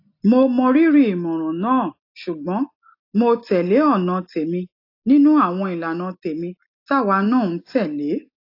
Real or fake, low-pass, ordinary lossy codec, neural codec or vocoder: real; 5.4 kHz; AAC, 32 kbps; none